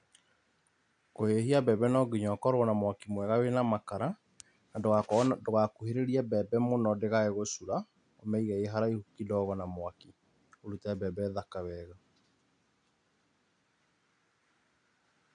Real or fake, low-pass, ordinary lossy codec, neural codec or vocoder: real; 9.9 kHz; AAC, 64 kbps; none